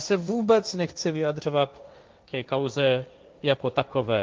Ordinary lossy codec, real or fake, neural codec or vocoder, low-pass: Opus, 24 kbps; fake; codec, 16 kHz, 1.1 kbps, Voila-Tokenizer; 7.2 kHz